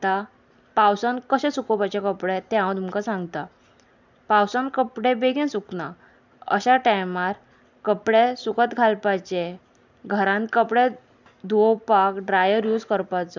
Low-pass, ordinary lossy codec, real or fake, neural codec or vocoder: 7.2 kHz; none; real; none